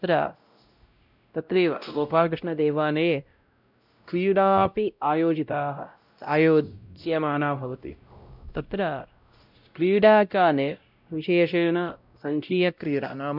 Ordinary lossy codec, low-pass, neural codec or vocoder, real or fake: none; 5.4 kHz; codec, 16 kHz, 0.5 kbps, X-Codec, WavLM features, trained on Multilingual LibriSpeech; fake